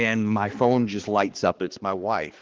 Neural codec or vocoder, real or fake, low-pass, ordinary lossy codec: codec, 16 kHz, 2 kbps, X-Codec, HuBERT features, trained on balanced general audio; fake; 7.2 kHz; Opus, 16 kbps